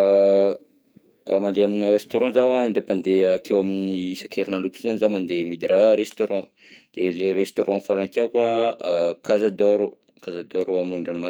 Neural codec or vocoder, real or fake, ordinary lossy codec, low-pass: codec, 44.1 kHz, 2.6 kbps, SNAC; fake; none; none